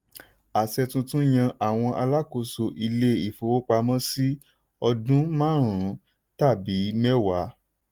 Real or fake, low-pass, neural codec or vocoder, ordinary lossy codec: real; 19.8 kHz; none; Opus, 32 kbps